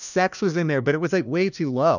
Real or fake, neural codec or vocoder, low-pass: fake; codec, 16 kHz, 1 kbps, FunCodec, trained on LibriTTS, 50 frames a second; 7.2 kHz